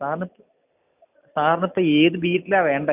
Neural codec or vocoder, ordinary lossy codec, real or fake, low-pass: none; none; real; 3.6 kHz